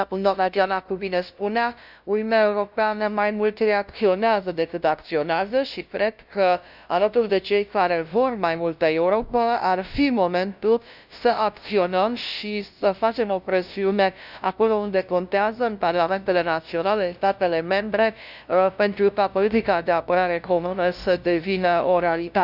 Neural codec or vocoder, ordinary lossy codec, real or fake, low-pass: codec, 16 kHz, 0.5 kbps, FunCodec, trained on LibriTTS, 25 frames a second; none; fake; 5.4 kHz